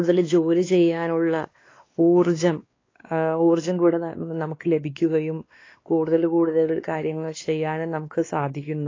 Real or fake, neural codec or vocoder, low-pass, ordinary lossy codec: fake; codec, 16 kHz, 2 kbps, X-Codec, WavLM features, trained on Multilingual LibriSpeech; 7.2 kHz; AAC, 32 kbps